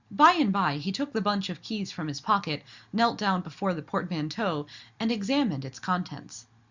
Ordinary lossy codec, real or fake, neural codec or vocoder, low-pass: Opus, 64 kbps; real; none; 7.2 kHz